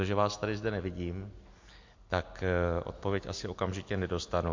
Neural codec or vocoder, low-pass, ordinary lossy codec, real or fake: none; 7.2 kHz; MP3, 48 kbps; real